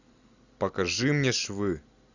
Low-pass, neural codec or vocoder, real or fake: 7.2 kHz; none; real